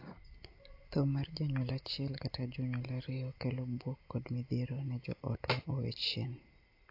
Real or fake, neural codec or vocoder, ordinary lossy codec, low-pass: real; none; AAC, 32 kbps; 5.4 kHz